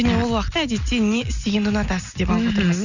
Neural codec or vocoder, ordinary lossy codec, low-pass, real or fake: none; none; 7.2 kHz; real